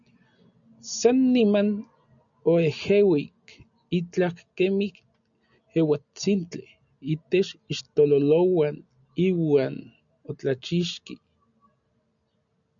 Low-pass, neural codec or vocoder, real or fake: 7.2 kHz; none; real